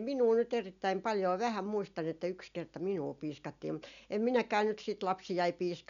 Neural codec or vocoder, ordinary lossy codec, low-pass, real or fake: none; none; 7.2 kHz; real